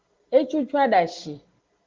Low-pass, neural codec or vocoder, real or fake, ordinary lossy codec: 7.2 kHz; none; real; Opus, 16 kbps